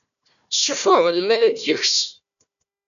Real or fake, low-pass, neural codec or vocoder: fake; 7.2 kHz; codec, 16 kHz, 1 kbps, FunCodec, trained on Chinese and English, 50 frames a second